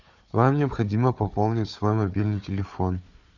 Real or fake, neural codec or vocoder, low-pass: fake; codec, 16 kHz, 4 kbps, FunCodec, trained on Chinese and English, 50 frames a second; 7.2 kHz